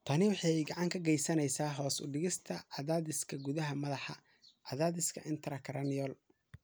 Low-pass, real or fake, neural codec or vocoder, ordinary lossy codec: none; real; none; none